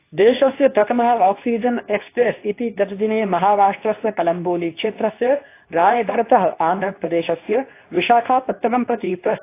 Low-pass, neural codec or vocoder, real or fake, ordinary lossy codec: 3.6 kHz; codec, 24 kHz, 0.9 kbps, WavTokenizer, medium speech release version 2; fake; AAC, 24 kbps